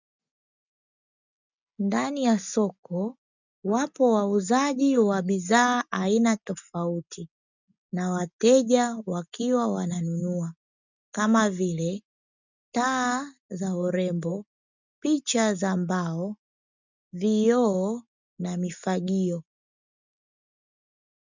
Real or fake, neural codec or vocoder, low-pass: real; none; 7.2 kHz